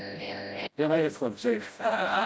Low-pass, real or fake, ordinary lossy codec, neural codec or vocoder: none; fake; none; codec, 16 kHz, 0.5 kbps, FreqCodec, smaller model